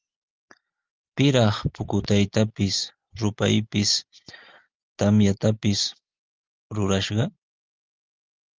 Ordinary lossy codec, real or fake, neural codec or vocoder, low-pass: Opus, 24 kbps; real; none; 7.2 kHz